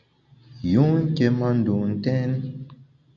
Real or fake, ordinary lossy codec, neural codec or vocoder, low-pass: real; AAC, 64 kbps; none; 7.2 kHz